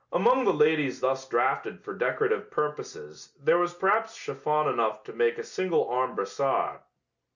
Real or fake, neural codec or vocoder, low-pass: real; none; 7.2 kHz